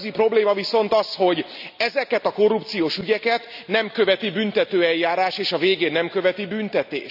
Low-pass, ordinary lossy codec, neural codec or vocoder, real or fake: 5.4 kHz; none; none; real